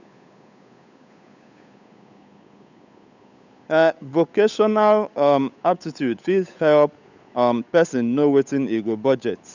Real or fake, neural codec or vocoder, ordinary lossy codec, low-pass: fake; codec, 16 kHz, 8 kbps, FunCodec, trained on Chinese and English, 25 frames a second; none; 7.2 kHz